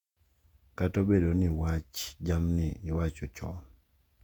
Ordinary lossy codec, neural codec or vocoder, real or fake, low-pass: none; vocoder, 48 kHz, 128 mel bands, Vocos; fake; 19.8 kHz